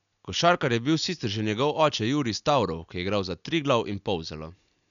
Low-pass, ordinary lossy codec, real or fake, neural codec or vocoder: 7.2 kHz; none; real; none